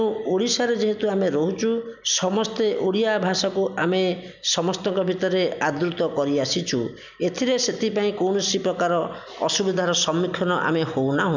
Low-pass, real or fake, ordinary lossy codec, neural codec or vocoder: 7.2 kHz; real; none; none